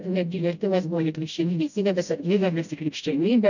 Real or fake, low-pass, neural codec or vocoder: fake; 7.2 kHz; codec, 16 kHz, 0.5 kbps, FreqCodec, smaller model